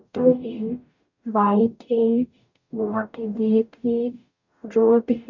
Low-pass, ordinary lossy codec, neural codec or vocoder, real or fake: 7.2 kHz; none; codec, 44.1 kHz, 0.9 kbps, DAC; fake